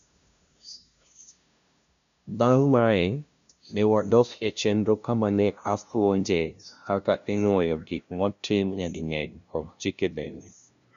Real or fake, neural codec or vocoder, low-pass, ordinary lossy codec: fake; codec, 16 kHz, 0.5 kbps, FunCodec, trained on LibriTTS, 25 frames a second; 7.2 kHz; none